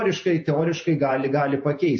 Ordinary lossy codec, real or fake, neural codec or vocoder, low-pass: MP3, 32 kbps; fake; vocoder, 48 kHz, 128 mel bands, Vocos; 9.9 kHz